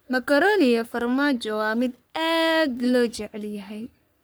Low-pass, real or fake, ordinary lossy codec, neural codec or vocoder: none; fake; none; codec, 44.1 kHz, 3.4 kbps, Pupu-Codec